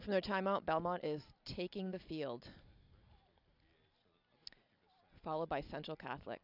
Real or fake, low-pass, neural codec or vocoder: real; 5.4 kHz; none